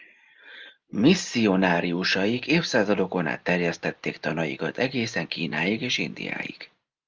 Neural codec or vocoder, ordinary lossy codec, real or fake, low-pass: none; Opus, 24 kbps; real; 7.2 kHz